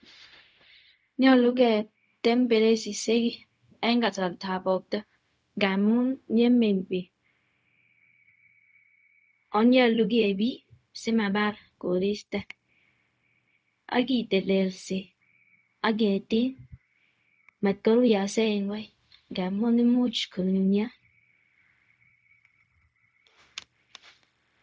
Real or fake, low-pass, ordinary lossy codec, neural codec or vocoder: fake; none; none; codec, 16 kHz, 0.4 kbps, LongCat-Audio-Codec